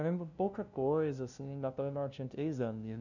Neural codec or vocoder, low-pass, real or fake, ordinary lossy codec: codec, 16 kHz, 0.5 kbps, FunCodec, trained on LibriTTS, 25 frames a second; 7.2 kHz; fake; none